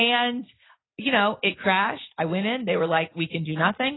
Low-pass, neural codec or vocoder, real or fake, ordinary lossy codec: 7.2 kHz; none; real; AAC, 16 kbps